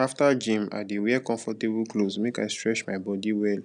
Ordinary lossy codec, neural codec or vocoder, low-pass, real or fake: none; none; 9.9 kHz; real